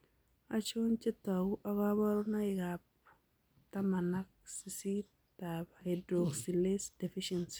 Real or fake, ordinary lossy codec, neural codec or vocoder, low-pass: real; none; none; none